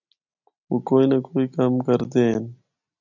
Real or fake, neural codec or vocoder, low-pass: real; none; 7.2 kHz